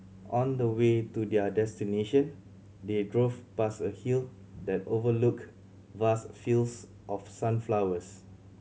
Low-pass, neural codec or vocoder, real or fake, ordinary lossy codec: none; none; real; none